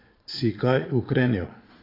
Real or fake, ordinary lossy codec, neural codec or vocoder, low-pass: fake; AAC, 24 kbps; vocoder, 22.05 kHz, 80 mel bands, Vocos; 5.4 kHz